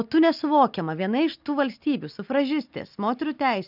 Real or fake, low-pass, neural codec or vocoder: real; 5.4 kHz; none